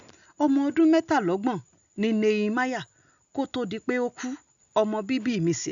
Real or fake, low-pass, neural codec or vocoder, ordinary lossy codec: real; 7.2 kHz; none; none